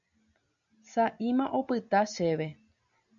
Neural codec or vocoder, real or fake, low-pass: none; real; 7.2 kHz